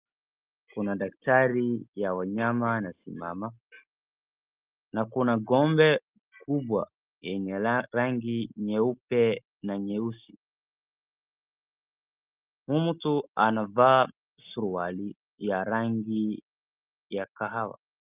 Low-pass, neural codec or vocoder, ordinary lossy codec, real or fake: 3.6 kHz; none; Opus, 24 kbps; real